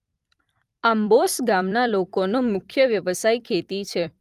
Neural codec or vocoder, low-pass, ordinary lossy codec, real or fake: vocoder, 44.1 kHz, 128 mel bands every 256 samples, BigVGAN v2; 14.4 kHz; Opus, 32 kbps; fake